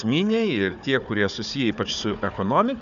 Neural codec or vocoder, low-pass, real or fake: codec, 16 kHz, 4 kbps, FunCodec, trained on Chinese and English, 50 frames a second; 7.2 kHz; fake